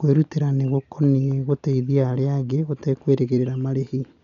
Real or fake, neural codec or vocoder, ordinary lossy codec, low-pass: real; none; none; 7.2 kHz